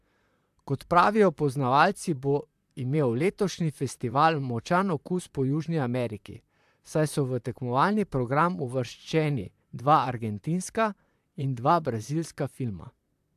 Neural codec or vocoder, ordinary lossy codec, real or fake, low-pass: vocoder, 44.1 kHz, 128 mel bands, Pupu-Vocoder; AAC, 96 kbps; fake; 14.4 kHz